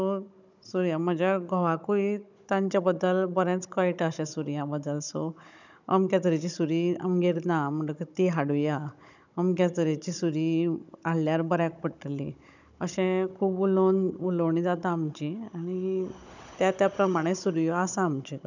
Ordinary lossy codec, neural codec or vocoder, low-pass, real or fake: none; codec, 16 kHz, 16 kbps, FunCodec, trained on Chinese and English, 50 frames a second; 7.2 kHz; fake